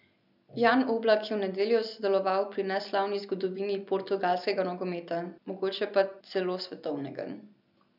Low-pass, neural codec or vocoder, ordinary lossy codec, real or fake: 5.4 kHz; none; none; real